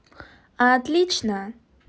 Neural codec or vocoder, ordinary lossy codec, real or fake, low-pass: none; none; real; none